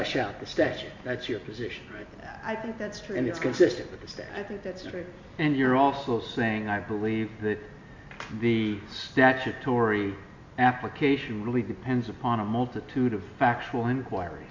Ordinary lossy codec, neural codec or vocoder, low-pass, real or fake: MP3, 64 kbps; none; 7.2 kHz; real